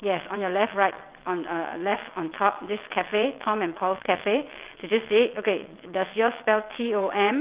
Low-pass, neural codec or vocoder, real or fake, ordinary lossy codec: 3.6 kHz; vocoder, 22.05 kHz, 80 mel bands, WaveNeXt; fake; Opus, 32 kbps